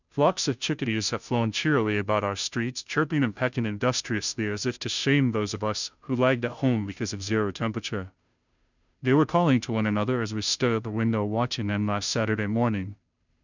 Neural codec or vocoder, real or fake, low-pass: codec, 16 kHz, 0.5 kbps, FunCodec, trained on Chinese and English, 25 frames a second; fake; 7.2 kHz